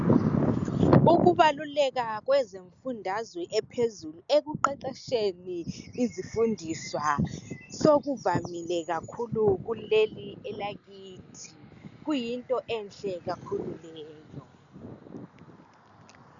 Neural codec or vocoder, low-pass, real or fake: none; 7.2 kHz; real